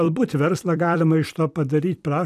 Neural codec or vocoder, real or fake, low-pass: vocoder, 44.1 kHz, 128 mel bands every 256 samples, BigVGAN v2; fake; 14.4 kHz